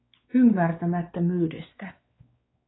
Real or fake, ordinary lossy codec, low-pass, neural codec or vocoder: fake; AAC, 16 kbps; 7.2 kHz; codec, 16 kHz, 4 kbps, X-Codec, WavLM features, trained on Multilingual LibriSpeech